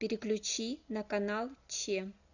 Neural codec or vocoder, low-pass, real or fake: none; 7.2 kHz; real